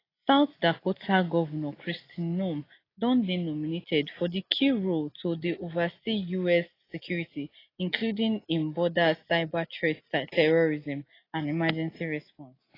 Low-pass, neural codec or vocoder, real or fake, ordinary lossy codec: 5.4 kHz; none; real; AAC, 24 kbps